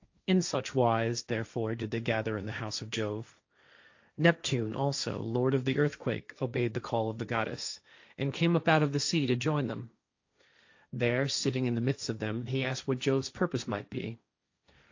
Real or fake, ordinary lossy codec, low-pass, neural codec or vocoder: fake; AAC, 48 kbps; 7.2 kHz; codec, 16 kHz, 1.1 kbps, Voila-Tokenizer